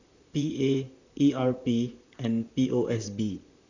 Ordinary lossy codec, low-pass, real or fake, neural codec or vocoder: none; 7.2 kHz; fake; vocoder, 44.1 kHz, 128 mel bands, Pupu-Vocoder